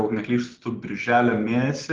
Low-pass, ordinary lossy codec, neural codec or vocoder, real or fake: 7.2 kHz; Opus, 16 kbps; none; real